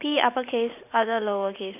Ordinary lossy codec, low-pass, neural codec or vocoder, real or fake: none; 3.6 kHz; vocoder, 44.1 kHz, 128 mel bands every 512 samples, BigVGAN v2; fake